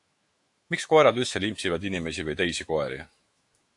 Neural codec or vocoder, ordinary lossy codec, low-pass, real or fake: autoencoder, 48 kHz, 128 numbers a frame, DAC-VAE, trained on Japanese speech; AAC, 64 kbps; 10.8 kHz; fake